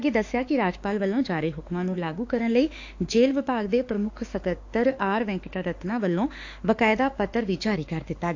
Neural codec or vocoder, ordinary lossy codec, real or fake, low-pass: autoencoder, 48 kHz, 32 numbers a frame, DAC-VAE, trained on Japanese speech; AAC, 48 kbps; fake; 7.2 kHz